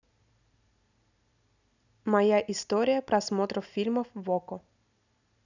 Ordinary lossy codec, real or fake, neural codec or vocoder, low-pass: none; real; none; 7.2 kHz